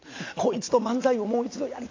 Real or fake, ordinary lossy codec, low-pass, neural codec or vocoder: real; AAC, 32 kbps; 7.2 kHz; none